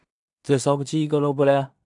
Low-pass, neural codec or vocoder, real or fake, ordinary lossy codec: 10.8 kHz; codec, 16 kHz in and 24 kHz out, 0.4 kbps, LongCat-Audio-Codec, two codebook decoder; fake; MP3, 96 kbps